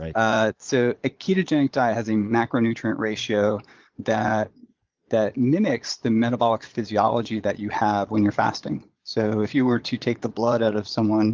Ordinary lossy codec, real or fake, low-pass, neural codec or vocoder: Opus, 32 kbps; fake; 7.2 kHz; vocoder, 22.05 kHz, 80 mel bands, Vocos